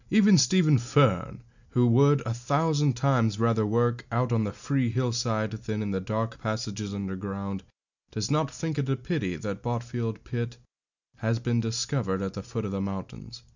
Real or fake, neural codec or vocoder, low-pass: real; none; 7.2 kHz